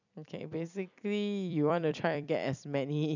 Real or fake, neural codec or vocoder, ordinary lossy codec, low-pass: fake; vocoder, 44.1 kHz, 128 mel bands every 256 samples, BigVGAN v2; none; 7.2 kHz